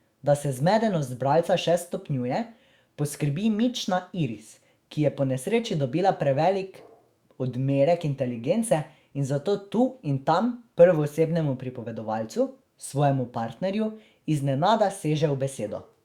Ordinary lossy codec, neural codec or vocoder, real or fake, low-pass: Opus, 64 kbps; autoencoder, 48 kHz, 128 numbers a frame, DAC-VAE, trained on Japanese speech; fake; 19.8 kHz